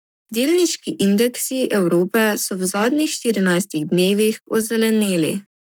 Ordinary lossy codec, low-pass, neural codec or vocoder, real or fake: none; none; codec, 44.1 kHz, 7.8 kbps, Pupu-Codec; fake